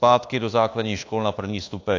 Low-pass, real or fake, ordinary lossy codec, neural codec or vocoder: 7.2 kHz; fake; AAC, 48 kbps; codec, 24 kHz, 1.2 kbps, DualCodec